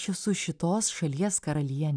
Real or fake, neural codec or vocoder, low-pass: real; none; 9.9 kHz